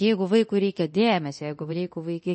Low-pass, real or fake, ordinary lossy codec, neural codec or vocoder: 10.8 kHz; fake; MP3, 32 kbps; codec, 24 kHz, 0.9 kbps, DualCodec